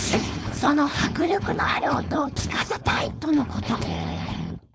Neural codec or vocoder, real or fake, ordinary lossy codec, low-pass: codec, 16 kHz, 4.8 kbps, FACodec; fake; none; none